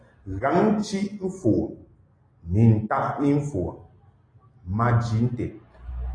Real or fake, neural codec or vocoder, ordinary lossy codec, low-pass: real; none; AAC, 32 kbps; 9.9 kHz